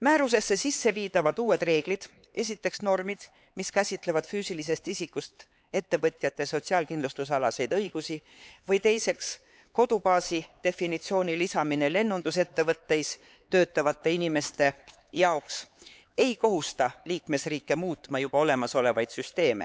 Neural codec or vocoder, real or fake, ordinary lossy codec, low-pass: codec, 16 kHz, 4 kbps, X-Codec, HuBERT features, trained on LibriSpeech; fake; none; none